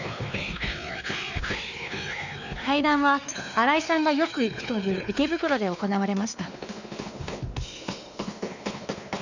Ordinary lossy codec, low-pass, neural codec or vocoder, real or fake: none; 7.2 kHz; codec, 16 kHz, 2 kbps, X-Codec, WavLM features, trained on Multilingual LibriSpeech; fake